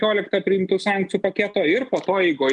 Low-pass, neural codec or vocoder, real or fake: 10.8 kHz; none; real